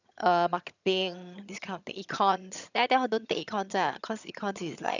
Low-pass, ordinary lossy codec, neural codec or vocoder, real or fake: 7.2 kHz; none; vocoder, 22.05 kHz, 80 mel bands, HiFi-GAN; fake